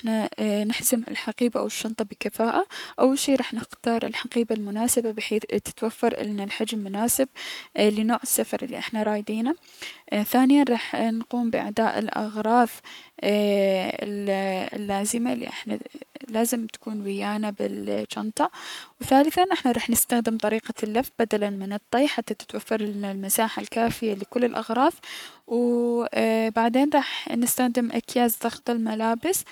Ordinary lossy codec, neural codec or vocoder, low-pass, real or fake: none; codec, 44.1 kHz, 7.8 kbps, Pupu-Codec; 19.8 kHz; fake